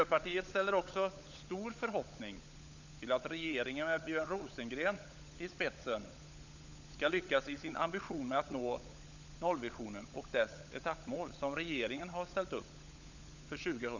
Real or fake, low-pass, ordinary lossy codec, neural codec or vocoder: fake; 7.2 kHz; none; codec, 16 kHz, 16 kbps, FunCodec, trained on LibriTTS, 50 frames a second